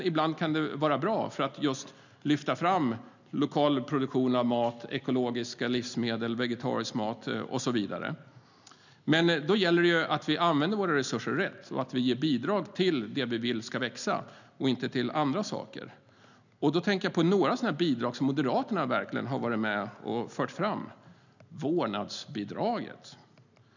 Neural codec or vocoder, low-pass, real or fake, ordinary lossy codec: none; 7.2 kHz; real; none